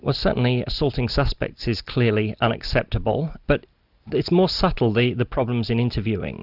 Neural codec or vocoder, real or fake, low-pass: none; real; 5.4 kHz